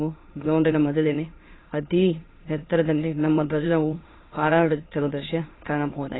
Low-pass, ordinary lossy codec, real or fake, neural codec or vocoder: 7.2 kHz; AAC, 16 kbps; fake; autoencoder, 22.05 kHz, a latent of 192 numbers a frame, VITS, trained on many speakers